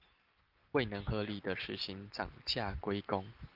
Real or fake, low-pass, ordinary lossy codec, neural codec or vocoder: real; 5.4 kHz; Opus, 32 kbps; none